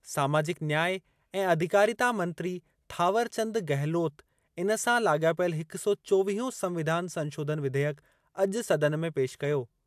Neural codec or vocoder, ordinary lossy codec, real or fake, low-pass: none; AAC, 96 kbps; real; 14.4 kHz